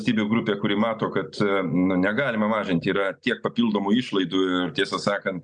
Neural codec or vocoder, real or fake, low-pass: none; real; 9.9 kHz